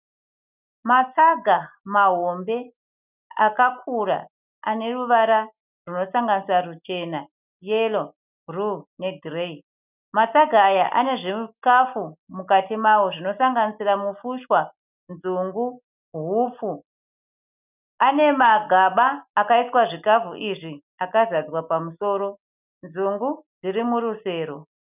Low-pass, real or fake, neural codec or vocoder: 3.6 kHz; real; none